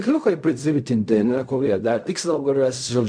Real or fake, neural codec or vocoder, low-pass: fake; codec, 16 kHz in and 24 kHz out, 0.4 kbps, LongCat-Audio-Codec, fine tuned four codebook decoder; 9.9 kHz